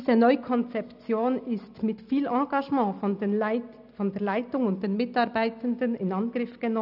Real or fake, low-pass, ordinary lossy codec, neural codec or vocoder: real; 5.4 kHz; none; none